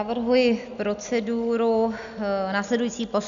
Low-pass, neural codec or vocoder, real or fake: 7.2 kHz; none; real